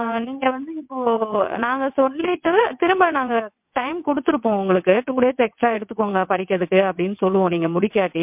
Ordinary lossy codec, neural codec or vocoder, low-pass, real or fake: MP3, 32 kbps; vocoder, 22.05 kHz, 80 mel bands, WaveNeXt; 3.6 kHz; fake